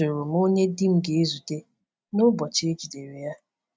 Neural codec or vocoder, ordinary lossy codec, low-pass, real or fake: none; none; none; real